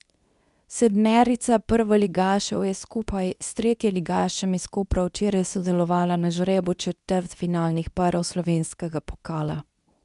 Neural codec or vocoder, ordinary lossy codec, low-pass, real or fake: codec, 24 kHz, 0.9 kbps, WavTokenizer, medium speech release version 2; none; 10.8 kHz; fake